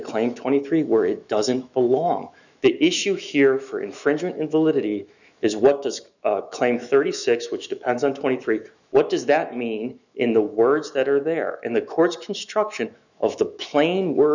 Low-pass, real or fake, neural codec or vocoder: 7.2 kHz; fake; autoencoder, 48 kHz, 128 numbers a frame, DAC-VAE, trained on Japanese speech